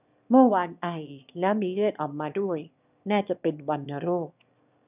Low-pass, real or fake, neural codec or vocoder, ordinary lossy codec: 3.6 kHz; fake; autoencoder, 22.05 kHz, a latent of 192 numbers a frame, VITS, trained on one speaker; none